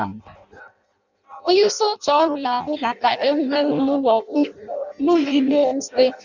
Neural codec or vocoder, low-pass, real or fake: codec, 16 kHz in and 24 kHz out, 0.6 kbps, FireRedTTS-2 codec; 7.2 kHz; fake